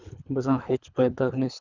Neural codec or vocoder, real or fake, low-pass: codec, 24 kHz, 3 kbps, HILCodec; fake; 7.2 kHz